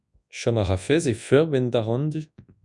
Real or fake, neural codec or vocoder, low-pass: fake; codec, 24 kHz, 0.9 kbps, WavTokenizer, large speech release; 10.8 kHz